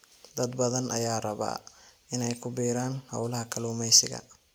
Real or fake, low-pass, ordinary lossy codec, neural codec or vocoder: real; none; none; none